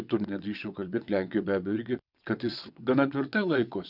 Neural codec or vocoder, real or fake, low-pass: vocoder, 22.05 kHz, 80 mel bands, WaveNeXt; fake; 5.4 kHz